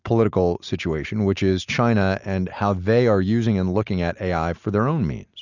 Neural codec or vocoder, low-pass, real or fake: none; 7.2 kHz; real